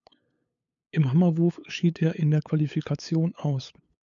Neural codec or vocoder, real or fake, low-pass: codec, 16 kHz, 8 kbps, FunCodec, trained on LibriTTS, 25 frames a second; fake; 7.2 kHz